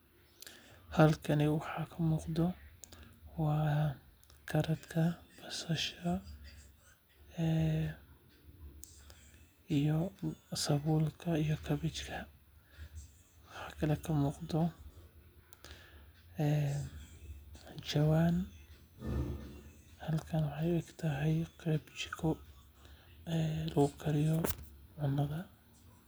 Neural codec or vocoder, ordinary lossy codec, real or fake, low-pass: none; none; real; none